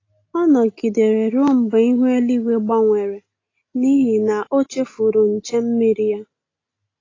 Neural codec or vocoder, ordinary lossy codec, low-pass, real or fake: none; AAC, 32 kbps; 7.2 kHz; real